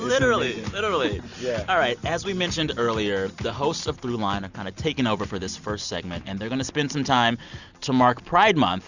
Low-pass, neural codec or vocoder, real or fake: 7.2 kHz; none; real